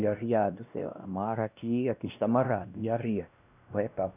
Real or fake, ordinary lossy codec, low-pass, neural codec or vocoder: fake; none; 3.6 kHz; codec, 16 kHz, 1 kbps, X-Codec, WavLM features, trained on Multilingual LibriSpeech